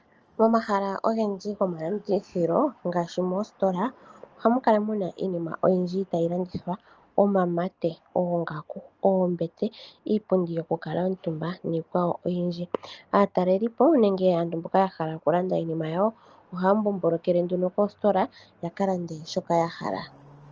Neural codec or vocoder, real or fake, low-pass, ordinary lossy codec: none; real; 7.2 kHz; Opus, 24 kbps